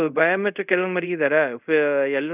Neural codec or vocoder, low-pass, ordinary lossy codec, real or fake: codec, 24 kHz, 0.5 kbps, DualCodec; 3.6 kHz; none; fake